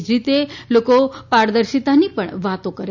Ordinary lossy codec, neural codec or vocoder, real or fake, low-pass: none; none; real; 7.2 kHz